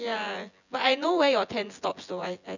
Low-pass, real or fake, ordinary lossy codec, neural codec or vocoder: 7.2 kHz; fake; none; vocoder, 24 kHz, 100 mel bands, Vocos